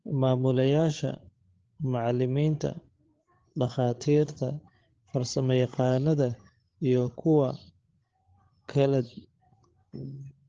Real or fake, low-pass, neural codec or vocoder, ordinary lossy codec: fake; 7.2 kHz; codec, 16 kHz, 8 kbps, FreqCodec, larger model; Opus, 16 kbps